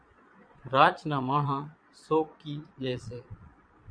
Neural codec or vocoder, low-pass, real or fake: vocoder, 22.05 kHz, 80 mel bands, Vocos; 9.9 kHz; fake